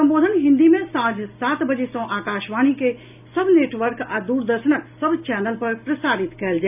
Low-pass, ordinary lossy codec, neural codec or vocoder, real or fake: 3.6 kHz; none; none; real